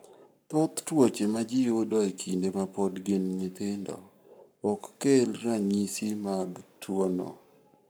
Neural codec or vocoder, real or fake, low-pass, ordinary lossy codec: codec, 44.1 kHz, 7.8 kbps, Pupu-Codec; fake; none; none